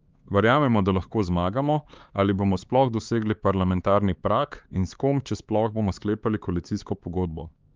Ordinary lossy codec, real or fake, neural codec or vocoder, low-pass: Opus, 32 kbps; fake; codec, 16 kHz, 4 kbps, X-Codec, HuBERT features, trained on LibriSpeech; 7.2 kHz